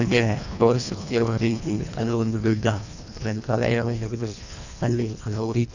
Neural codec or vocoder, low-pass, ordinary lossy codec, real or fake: codec, 24 kHz, 1.5 kbps, HILCodec; 7.2 kHz; none; fake